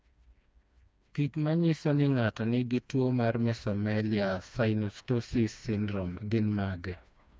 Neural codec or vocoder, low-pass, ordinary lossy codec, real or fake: codec, 16 kHz, 2 kbps, FreqCodec, smaller model; none; none; fake